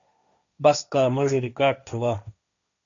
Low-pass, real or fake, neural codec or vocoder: 7.2 kHz; fake; codec, 16 kHz, 1.1 kbps, Voila-Tokenizer